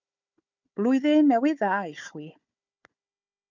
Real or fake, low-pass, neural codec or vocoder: fake; 7.2 kHz; codec, 16 kHz, 4 kbps, FunCodec, trained on Chinese and English, 50 frames a second